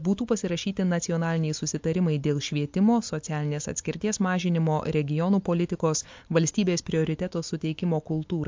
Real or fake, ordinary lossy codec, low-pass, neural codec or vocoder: real; MP3, 48 kbps; 7.2 kHz; none